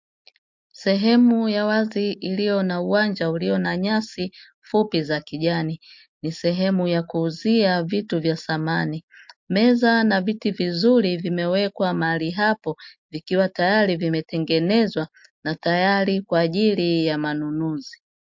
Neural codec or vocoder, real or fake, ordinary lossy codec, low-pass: none; real; MP3, 48 kbps; 7.2 kHz